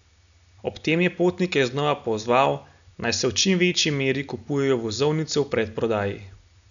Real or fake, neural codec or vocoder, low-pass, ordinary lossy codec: real; none; 7.2 kHz; none